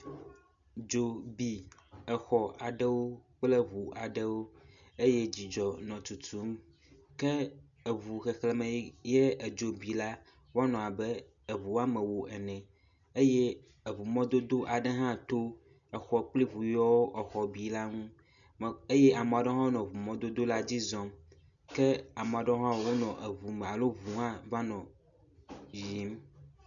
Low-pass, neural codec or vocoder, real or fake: 7.2 kHz; none; real